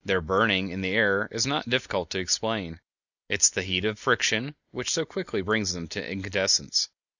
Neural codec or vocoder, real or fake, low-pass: none; real; 7.2 kHz